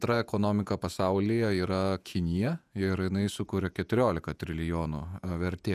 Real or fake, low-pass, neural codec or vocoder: fake; 14.4 kHz; vocoder, 48 kHz, 128 mel bands, Vocos